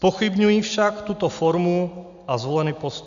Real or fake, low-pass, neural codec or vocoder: real; 7.2 kHz; none